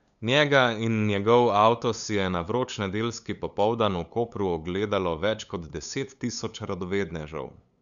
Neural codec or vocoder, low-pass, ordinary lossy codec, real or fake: codec, 16 kHz, 8 kbps, FunCodec, trained on LibriTTS, 25 frames a second; 7.2 kHz; none; fake